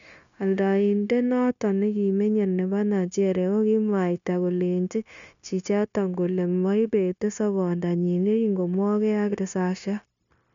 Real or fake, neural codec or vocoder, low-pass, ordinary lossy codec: fake; codec, 16 kHz, 0.9 kbps, LongCat-Audio-Codec; 7.2 kHz; none